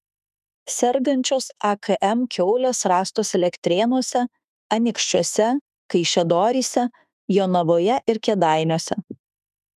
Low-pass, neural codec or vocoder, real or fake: 14.4 kHz; autoencoder, 48 kHz, 32 numbers a frame, DAC-VAE, trained on Japanese speech; fake